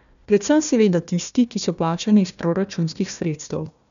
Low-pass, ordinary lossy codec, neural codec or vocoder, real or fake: 7.2 kHz; none; codec, 16 kHz, 1 kbps, FunCodec, trained on Chinese and English, 50 frames a second; fake